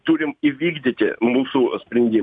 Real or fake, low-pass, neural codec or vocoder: real; 9.9 kHz; none